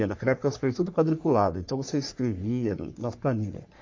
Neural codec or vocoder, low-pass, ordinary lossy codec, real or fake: codec, 44.1 kHz, 3.4 kbps, Pupu-Codec; 7.2 kHz; MP3, 48 kbps; fake